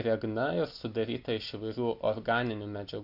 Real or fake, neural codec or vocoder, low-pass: real; none; 5.4 kHz